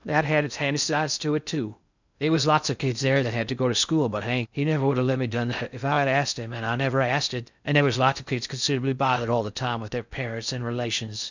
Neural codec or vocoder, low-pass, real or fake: codec, 16 kHz in and 24 kHz out, 0.6 kbps, FocalCodec, streaming, 2048 codes; 7.2 kHz; fake